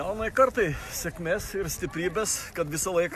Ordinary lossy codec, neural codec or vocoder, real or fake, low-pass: MP3, 96 kbps; none; real; 14.4 kHz